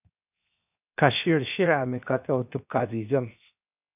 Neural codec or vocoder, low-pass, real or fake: codec, 16 kHz, 0.7 kbps, FocalCodec; 3.6 kHz; fake